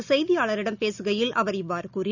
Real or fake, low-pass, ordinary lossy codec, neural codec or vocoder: real; 7.2 kHz; none; none